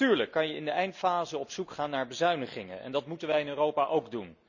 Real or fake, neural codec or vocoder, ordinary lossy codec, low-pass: real; none; none; 7.2 kHz